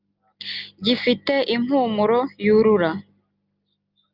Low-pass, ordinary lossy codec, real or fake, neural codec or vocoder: 5.4 kHz; Opus, 24 kbps; real; none